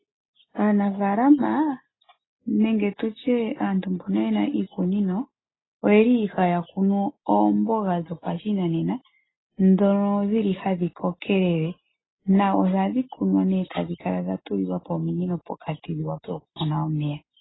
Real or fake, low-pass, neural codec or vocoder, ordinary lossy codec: real; 7.2 kHz; none; AAC, 16 kbps